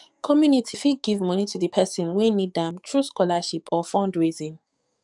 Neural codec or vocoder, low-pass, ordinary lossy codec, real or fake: codec, 44.1 kHz, 7.8 kbps, DAC; 10.8 kHz; none; fake